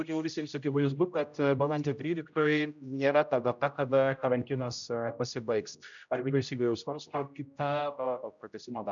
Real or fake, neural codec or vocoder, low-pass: fake; codec, 16 kHz, 0.5 kbps, X-Codec, HuBERT features, trained on general audio; 7.2 kHz